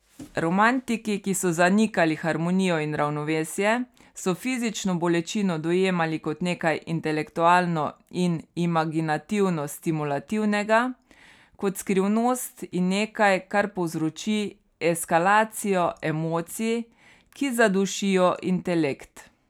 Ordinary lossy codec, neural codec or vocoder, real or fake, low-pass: none; none; real; 19.8 kHz